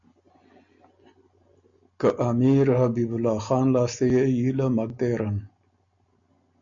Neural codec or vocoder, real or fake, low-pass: none; real; 7.2 kHz